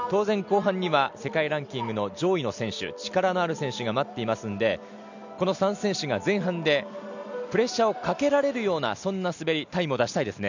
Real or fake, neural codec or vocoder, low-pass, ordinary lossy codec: real; none; 7.2 kHz; none